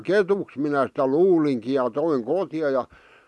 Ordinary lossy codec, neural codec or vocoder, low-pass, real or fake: none; none; none; real